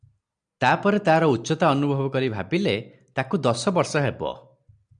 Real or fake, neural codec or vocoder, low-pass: real; none; 9.9 kHz